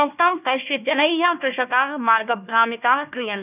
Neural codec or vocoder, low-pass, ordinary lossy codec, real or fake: codec, 16 kHz, 1 kbps, FunCodec, trained on Chinese and English, 50 frames a second; 3.6 kHz; none; fake